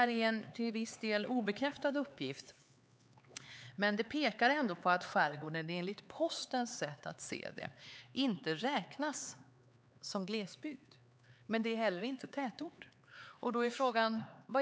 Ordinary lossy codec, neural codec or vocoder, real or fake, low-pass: none; codec, 16 kHz, 4 kbps, X-Codec, HuBERT features, trained on LibriSpeech; fake; none